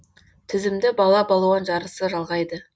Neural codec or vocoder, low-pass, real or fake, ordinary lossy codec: none; none; real; none